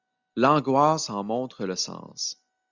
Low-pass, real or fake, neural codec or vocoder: 7.2 kHz; real; none